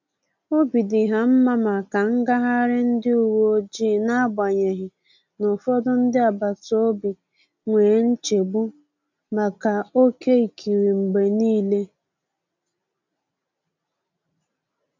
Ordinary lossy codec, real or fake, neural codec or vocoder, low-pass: none; real; none; 7.2 kHz